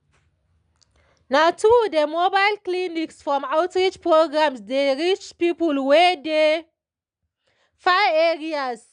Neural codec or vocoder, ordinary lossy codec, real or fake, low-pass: none; none; real; 9.9 kHz